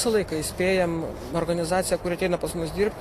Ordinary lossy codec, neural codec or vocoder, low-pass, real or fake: AAC, 48 kbps; none; 14.4 kHz; real